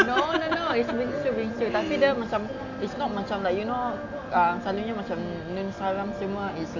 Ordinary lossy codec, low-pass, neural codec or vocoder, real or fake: AAC, 48 kbps; 7.2 kHz; none; real